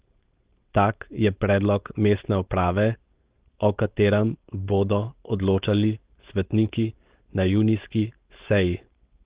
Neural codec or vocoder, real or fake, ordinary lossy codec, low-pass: codec, 16 kHz, 4.8 kbps, FACodec; fake; Opus, 16 kbps; 3.6 kHz